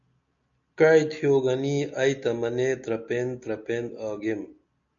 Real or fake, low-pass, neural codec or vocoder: real; 7.2 kHz; none